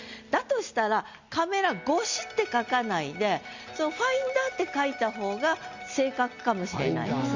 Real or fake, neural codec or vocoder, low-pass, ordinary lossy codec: real; none; 7.2 kHz; Opus, 64 kbps